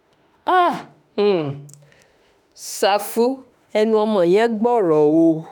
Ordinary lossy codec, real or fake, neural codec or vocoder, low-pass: none; fake; autoencoder, 48 kHz, 32 numbers a frame, DAC-VAE, trained on Japanese speech; none